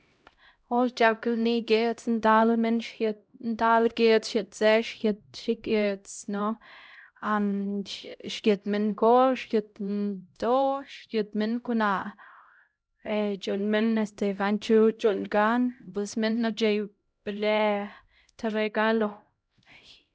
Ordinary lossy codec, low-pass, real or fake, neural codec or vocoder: none; none; fake; codec, 16 kHz, 0.5 kbps, X-Codec, HuBERT features, trained on LibriSpeech